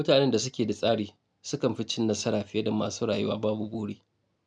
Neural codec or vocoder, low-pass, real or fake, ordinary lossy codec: none; 9.9 kHz; real; none